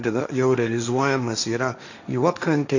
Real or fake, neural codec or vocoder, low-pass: fake; codec, 16 kHz, 1.1 kbps, Voila-Tokenizer; 7.2 kHz